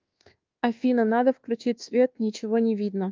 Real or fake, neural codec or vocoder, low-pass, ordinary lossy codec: fake; codec, 24 kHz, 1.2 kbps, DualCodec; 7.2 kHz; Opus, 32 kbps